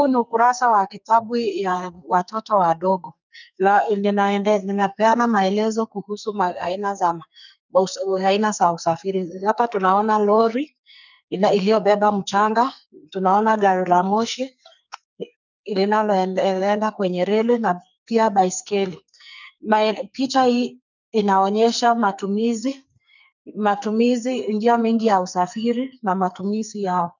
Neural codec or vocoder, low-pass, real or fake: codec, 44.1 kHz, 2.6 kbps, SNAC; 7.2 kHz; fake